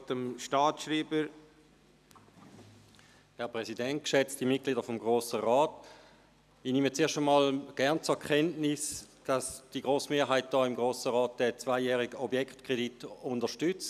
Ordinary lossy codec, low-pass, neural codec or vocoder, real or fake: none; 14.4 kHz; none; real